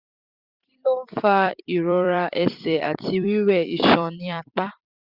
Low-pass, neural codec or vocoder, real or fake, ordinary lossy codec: 5.4 kHz; vocoder, 44.1 kHz, 128 mel bands every 256 samples, BigVGAN v2; fake; Opus, 64 kbps